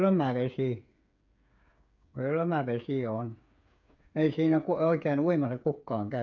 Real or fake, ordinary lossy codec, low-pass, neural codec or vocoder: fake; none; 7.2 kHz; codec, 16 kHz, 8 kbps, FreqCodec, smaller model